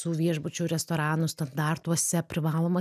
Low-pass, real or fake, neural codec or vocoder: 14.4 kHz; real; none